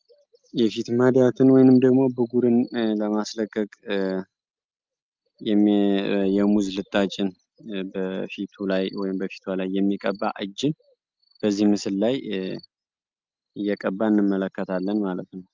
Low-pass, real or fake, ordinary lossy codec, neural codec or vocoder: 7.2 kHz; real; Opus, 24 kbps; none